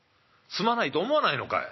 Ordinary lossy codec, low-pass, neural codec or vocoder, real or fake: MP3, 24 kbps; 7.2 kHz; none; real